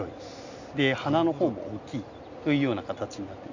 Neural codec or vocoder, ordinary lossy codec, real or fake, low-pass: none; Opus, 64 kbps; real; 7.2 kHz